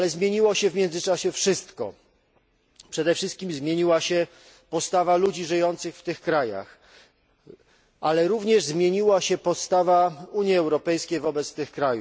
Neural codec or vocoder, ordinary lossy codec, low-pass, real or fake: none; none; none; real